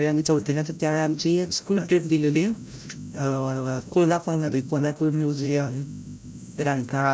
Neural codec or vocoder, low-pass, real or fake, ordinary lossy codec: codec, 16 kHz, 0.5 kbps, FreqCodec, larger model; none; fake; none